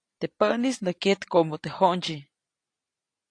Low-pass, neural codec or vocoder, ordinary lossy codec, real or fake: 9.9 kHz; none; AAC, 48 kbps; real